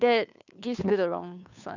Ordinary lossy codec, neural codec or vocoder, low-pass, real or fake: none; codec, 16 kHz, 2 kbps, FunCodec, trained on Chinese and English, 25 frames a second; 7.2 kHz; fake